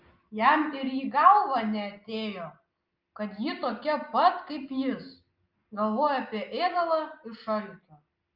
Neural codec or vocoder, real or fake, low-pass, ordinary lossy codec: none; real; 5.4 kHz; Opus, 24 kbps